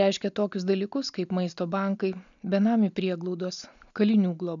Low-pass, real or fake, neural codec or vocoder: 7.2 kHz; real; none